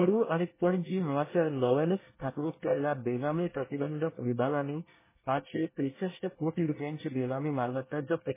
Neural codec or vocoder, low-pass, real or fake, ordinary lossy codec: codec, 24 kHz, 1 kbps, SNAC; 3.6 kHz; fake; MP3, 16 kbps